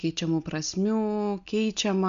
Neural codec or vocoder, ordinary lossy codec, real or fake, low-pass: none; MP3, 64 kbps; real; 7.2 kHz